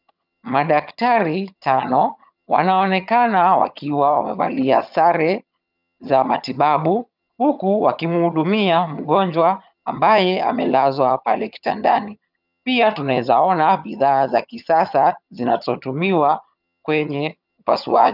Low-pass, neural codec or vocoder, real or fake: 5.4 kHz; vocoder, 22.05 kHz, 80 mel bands, HiFi-GAN; fake